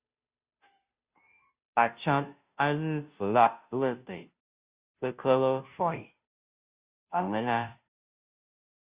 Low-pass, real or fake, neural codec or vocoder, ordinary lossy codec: 3.6 kHz; fake; codec, 16 kHz, 0.5 kbps, FunCodec, trained on Chinese and English, 25 frames a second; Opus, 64 kbps